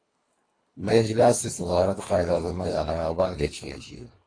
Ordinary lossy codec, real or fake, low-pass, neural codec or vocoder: AAC, 32 kbps; fake; 9.9 kHz; codec, 24 kHz, 1.5 kbps, HILCodec